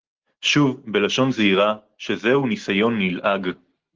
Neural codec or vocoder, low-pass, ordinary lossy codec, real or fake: none; 7.2 kHz; Opus, 16 kbps; real